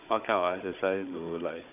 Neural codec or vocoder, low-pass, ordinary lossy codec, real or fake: codec, 16 kHz, 16 kbps, FunCodec, trained on Chinese and English, 50 frames a second; 3.6 kHz; none; fake